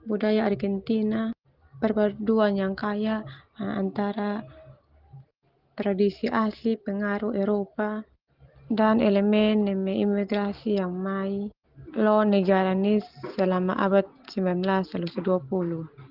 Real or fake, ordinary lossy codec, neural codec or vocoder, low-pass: real; Opus, 16 kbps; none; 5.4 kHz